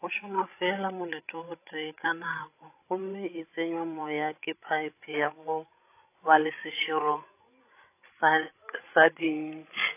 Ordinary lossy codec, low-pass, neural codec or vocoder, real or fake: AAC, 24 kbps; 3.6 kHz; codec, 16 kHz, 16 kbps, FreqCodec, larger model; fake